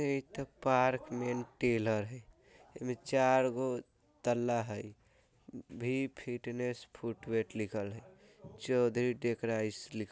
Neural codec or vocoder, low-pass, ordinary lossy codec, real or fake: none; none; none; real